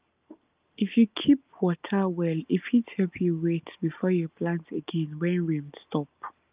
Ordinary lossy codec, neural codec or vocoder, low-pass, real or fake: Opus, 24 kbps; none; 3.6 kHz; real